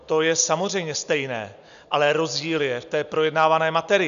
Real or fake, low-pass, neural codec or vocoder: real; 7.2 kHz; none